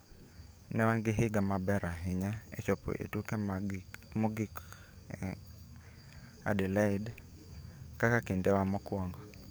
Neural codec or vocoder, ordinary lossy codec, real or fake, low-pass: codec, 44.1 kHz, 7.8 kbps, DAC; none; fake; none